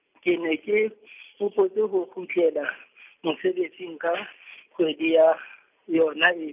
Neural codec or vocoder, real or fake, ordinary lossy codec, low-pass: none; real; none; 3.6 kHz